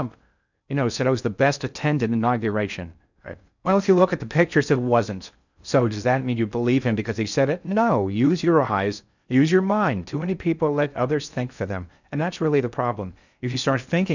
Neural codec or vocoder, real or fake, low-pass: codec, 16 kHz in and 24 kHz out, 0.6 kbps, FocalCodec, streaming, 2048 codes; fake; 7.2 kHz